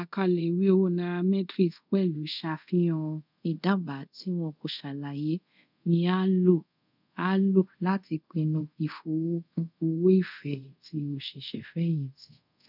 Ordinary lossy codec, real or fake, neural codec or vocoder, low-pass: none; fake; codec, 24 kHz, 0.5 kbps, DualCodec; 5.4 kHz